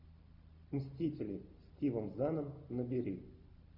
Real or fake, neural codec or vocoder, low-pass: real; none; 5.4 kHz